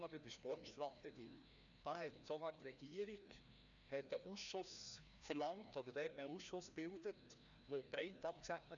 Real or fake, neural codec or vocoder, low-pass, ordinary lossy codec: fake; codec, 16 kHz, 1 kbps, FreqCodec, larger model; 7.2 kHz; none